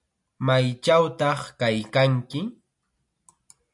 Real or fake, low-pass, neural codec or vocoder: real; 10.8 kHz; none